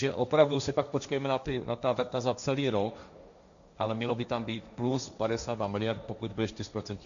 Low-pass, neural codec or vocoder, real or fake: 7.2 kHz; codec, 16 kHz, 1.1 kbps, Voila-Tokenizer; fake